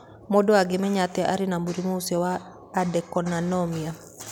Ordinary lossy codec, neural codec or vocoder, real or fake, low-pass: none; none; real; none